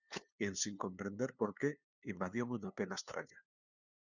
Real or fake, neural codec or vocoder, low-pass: fake; codec, 16 kHz, 2 kbps, FunCodec, trained on LibriTTS, 25 frames a second; 7.2 kHz